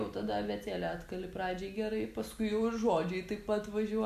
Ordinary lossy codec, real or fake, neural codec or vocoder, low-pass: AAC, 96 kbps; real; none; 14.4 kHz